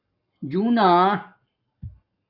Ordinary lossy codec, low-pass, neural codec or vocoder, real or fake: AAC, 48 kbps; 5.4 kHz; codec, 44.1 kHz, 7.8 kbps, Pupu-Codec; fake